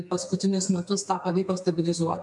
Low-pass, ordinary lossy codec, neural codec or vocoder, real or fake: 10.8 kHz; MP3, 96 kbps; codec, 32 kHz, 1.9 kbps, SNAC; fake